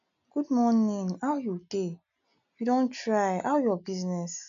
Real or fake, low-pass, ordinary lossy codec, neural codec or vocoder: real; 7.2 kHz; none; none